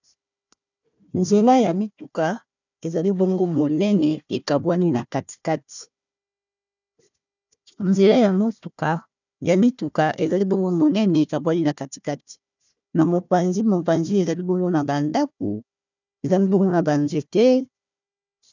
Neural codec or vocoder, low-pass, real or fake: codec, 16 kHz, 1 kbps, FunCodec, trained on Chinese and English, 50 frames a second; 7.2 kHz; fake